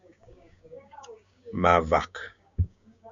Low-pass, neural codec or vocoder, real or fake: 7.2 kHz; codec, 16 kHz, 6 kbps, DAC; fake